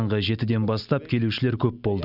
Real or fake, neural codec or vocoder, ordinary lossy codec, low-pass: real; none; none; 5.4 kHz